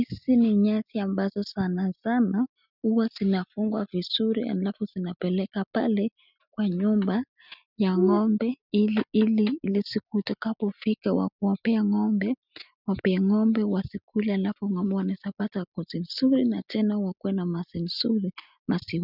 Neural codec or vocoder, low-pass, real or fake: none; 5.4 kHz; real